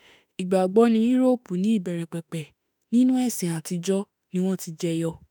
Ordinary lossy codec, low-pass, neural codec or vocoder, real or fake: none; none; autoencoder, 48 kHz, 32 numbers a frame, DAC-VAE, trained on Japanese speech; fake